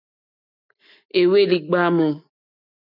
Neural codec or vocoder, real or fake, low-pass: none; real; 5.4 kHz